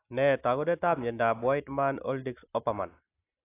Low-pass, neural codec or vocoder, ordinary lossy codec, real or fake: 3.6 kHz; none; AAC, 24 kbps; real